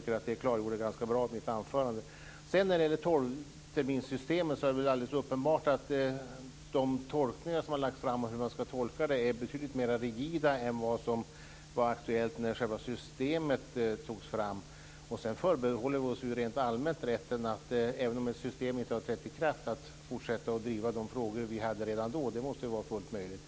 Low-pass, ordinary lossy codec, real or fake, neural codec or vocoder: none; none; real; none